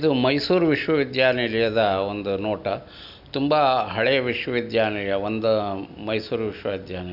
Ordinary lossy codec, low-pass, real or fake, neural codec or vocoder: none; 5.4 kHz; real; none